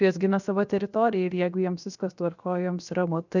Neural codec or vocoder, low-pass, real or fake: codec, 16 kHz, 0.7 kbps, FocalCodec; 7.2 kHz; fake